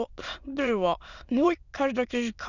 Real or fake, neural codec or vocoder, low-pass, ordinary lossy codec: fake; autoencoder, 22.05 kHz, a latent of 192 numbers a frame, VITS, trained on many speakers; 7.2 kHz; none